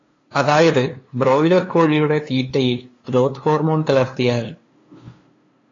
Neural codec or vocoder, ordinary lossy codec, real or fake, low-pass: codec, 16 kHz, 2 kbps, FunCodec, trained on LibriTTS, 25 frames a second; AAC, 32 kbps; fake; 7.2 kHz